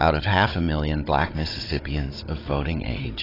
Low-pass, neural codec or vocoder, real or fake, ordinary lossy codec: 5.4 kHz; codec, 16 kHz, 16 kbps, FunCodec, trained on Chinese and English, 50 frames a second; fake; AAC, 24 kbps